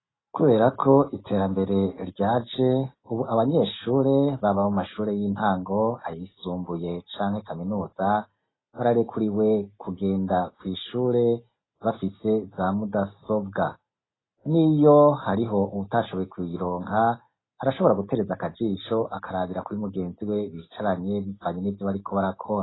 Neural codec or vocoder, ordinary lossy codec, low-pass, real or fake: none; AAC, 16 kbps; 7.2 kHz; real